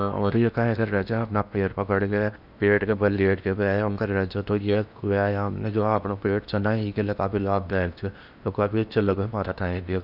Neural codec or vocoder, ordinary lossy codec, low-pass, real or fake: codec, 16 kHz in and 24 kHz out, 0.8 kbps, FocalCodec, streaming, 65536 codes; none; 5.4 kHz; fake